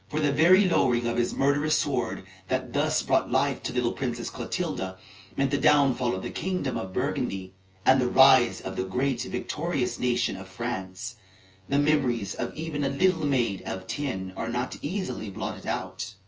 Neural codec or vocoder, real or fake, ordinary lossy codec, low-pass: vocoder, 24 kHz, 100 mel bands, Vocos; fake; Opus, 24 kbps; 7.2 kHz